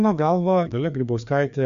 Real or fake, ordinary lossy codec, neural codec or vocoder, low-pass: fake; MP3, 64 kbps; codec, 16 kHz, 2 kbps, FreqCodec, larger model; 7.2 kHz